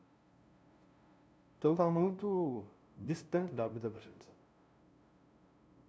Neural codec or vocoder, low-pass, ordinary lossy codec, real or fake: codec, 16 kHz, 0.5 kbps, FunCodec, trained on LibriTTS, 25 frames a second; none; none; fake